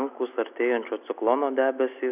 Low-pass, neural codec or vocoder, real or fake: 3.6 kHz; none; real